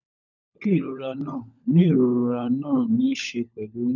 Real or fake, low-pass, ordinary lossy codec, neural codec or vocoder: fake; 7.2 kHz; none; codec, 16 kHz, 16 kbps, FunCodec, trained on LibriTTS, 50 frames a second